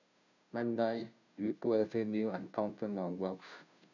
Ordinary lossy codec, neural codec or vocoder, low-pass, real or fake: none; codec, 16 kHz, 0.5 kbps, FunCodec, trained on Chinese and English, 25 frames a second; 7.2 kHz; fake